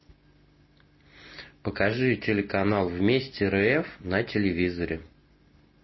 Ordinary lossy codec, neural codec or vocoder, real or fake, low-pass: MP3, 24 kbps; none; real; 7.2 kHz